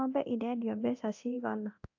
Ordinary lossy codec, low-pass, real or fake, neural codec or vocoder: AAC, 48 kbps; 7.2 kHz; fake; codec, 24 kHz, 0.9 kbps, DualCodec